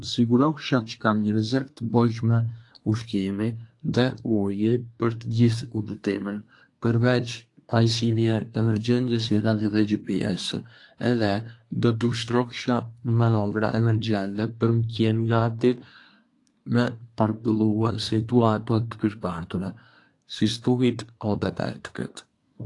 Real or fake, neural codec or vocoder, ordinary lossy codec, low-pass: fake; codec, 24 kHz, 1 kbps, SNAC; AAC, 48 kbps; 10.8 kHz